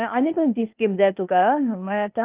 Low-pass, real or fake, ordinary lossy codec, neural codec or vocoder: 3.6 kHz; fake; Opus, 32 kbps; codec, 16 kHz, 0.8 kbps, ZipCodec